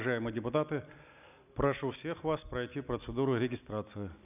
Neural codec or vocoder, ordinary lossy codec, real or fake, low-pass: none; none; real; 3.6 kHz